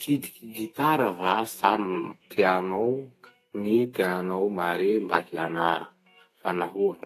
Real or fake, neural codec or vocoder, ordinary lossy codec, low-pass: fake; codec, 32 kHz, 1.9 kbps, SNAC; AAC, 48 kbps; 14.4 kHz